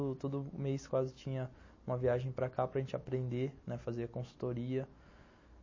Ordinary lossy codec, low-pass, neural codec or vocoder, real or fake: MP3, 32 kbps; 7.2 kHz; none; real